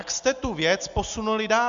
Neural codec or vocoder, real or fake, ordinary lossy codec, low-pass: none; real; MP3, 64 kbps; 7.2 kHz